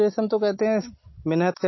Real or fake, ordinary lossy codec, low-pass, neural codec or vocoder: real; MP3, 24 kbps; 7.2 kHz; none